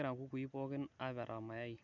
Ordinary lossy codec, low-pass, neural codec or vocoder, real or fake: none; 7.2 kHz; none; real